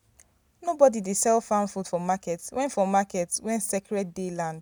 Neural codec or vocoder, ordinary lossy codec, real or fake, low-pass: none; none; real; none